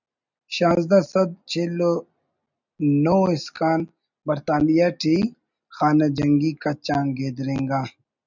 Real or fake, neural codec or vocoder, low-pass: real; none; 7.2 kHz